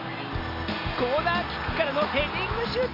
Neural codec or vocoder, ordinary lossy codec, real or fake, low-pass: none; none; real; 5.4 kHz